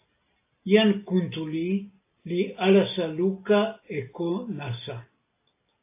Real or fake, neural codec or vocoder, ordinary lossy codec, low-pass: real; none; AAC, 24 kbps; 3.6 kHz